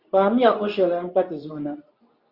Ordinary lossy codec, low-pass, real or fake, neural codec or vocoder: Opus, 64 kbps; 5.4 kHz; fake; codec, 24 kHz, 0.9 kbps, WavTokenizer, medium speech release version 2